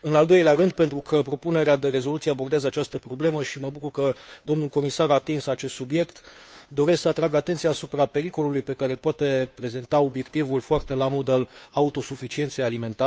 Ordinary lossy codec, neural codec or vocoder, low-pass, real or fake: none; codec, 16 kHz, 2 kbps, FunCodec, trained on Chinese and English, 25 frames a second; none; fake